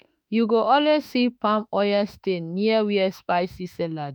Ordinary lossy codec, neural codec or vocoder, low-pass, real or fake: none; autoencoder, 48 kHz, 32 numbers a frame, DAC-VAE, trained on Japanese speech; none; fake